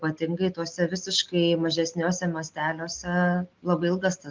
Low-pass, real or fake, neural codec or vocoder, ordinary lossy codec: 7.2 kHz; real; none; Opus, 24 kbps